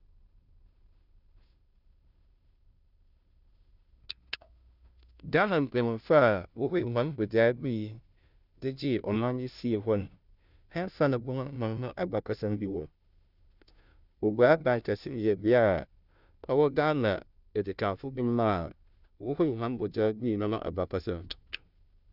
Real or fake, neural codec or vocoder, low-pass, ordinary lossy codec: fake; codec, 16 kHz, 0.5 kbps, FunCodec, trained on Chinese and English, 25 frames a second; 5.4 kHz; none